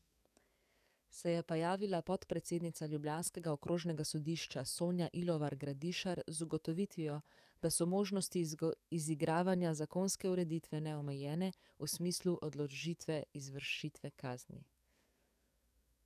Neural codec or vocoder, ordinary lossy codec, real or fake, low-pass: codec, 44.1 kHz, 7.8 kbps, DAC; none; fake; 14.4 kHz